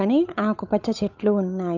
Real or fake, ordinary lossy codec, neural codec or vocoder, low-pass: fake; none; codec, 16 kHz, 8 kbps, FunCodec, trained on Chinese and English, 25 frames a second; 7.2 kHz